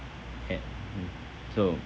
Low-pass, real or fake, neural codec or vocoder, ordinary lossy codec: none; real; none; none